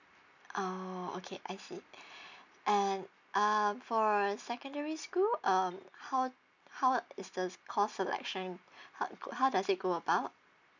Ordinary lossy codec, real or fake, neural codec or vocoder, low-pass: none; real; none; 7.2 kHz